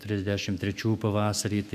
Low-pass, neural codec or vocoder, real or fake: 14.4 kHz; autoencoder, 48 kHz, 128 numbers a frame, DAC-VAE, trained on Japanese speech; fake